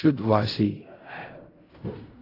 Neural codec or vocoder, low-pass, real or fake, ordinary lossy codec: codec, 16 kHz in and 24 kHz out, 0.4 kbps, LongCat-Audio-Codec, fine tuned four codebook decoder; 5.4 kHz; fake; AAC, 24 kbps